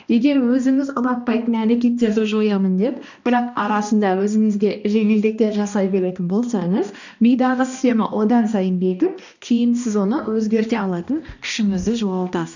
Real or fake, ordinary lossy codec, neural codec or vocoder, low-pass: fake; none; codec, 16 kHz, 1 kbps, X-Codec, HuBERT features, trained on balanced general audio; 7.2 kHz